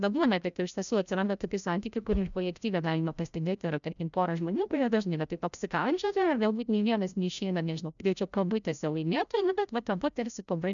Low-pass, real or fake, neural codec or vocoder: 7.2 kHz; fake; codec, 16 kHz, 0.5 kbps, FreqCodec, larger model